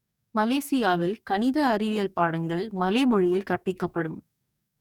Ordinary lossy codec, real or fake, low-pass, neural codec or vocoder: none; fake; 19.8 kHz; codec, 44.1 kHz, 2.6 kbps, DAC